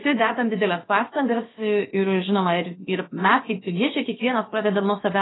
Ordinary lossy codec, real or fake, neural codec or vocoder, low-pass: AAC, 16 kbps; fake; codec, 16 kHz, about 1 kbps, DyCAST, with the encoder's durations; 7.2 kHz